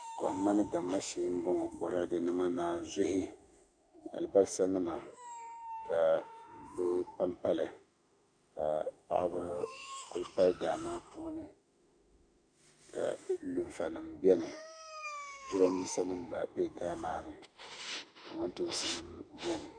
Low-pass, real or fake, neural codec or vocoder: 9.9 kHz; fake; autoencoder, 48 kHz, 32 numbers a frame, DAC-VAE, trained on Japanese speech